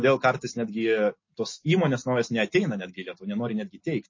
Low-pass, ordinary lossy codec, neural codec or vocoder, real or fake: 7.2 kHz; MP3, 32 kbps; none; real